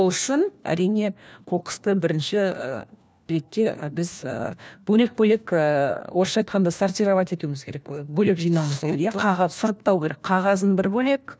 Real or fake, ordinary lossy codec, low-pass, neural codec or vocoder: fake; none; none; codec, 16 kHz, 1 kbps, FunCodec, trained on LibriTTS, 50 frames a second